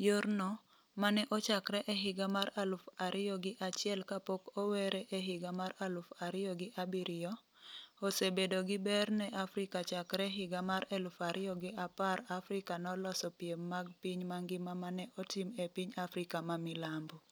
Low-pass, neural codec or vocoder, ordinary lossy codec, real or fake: none; none; none; real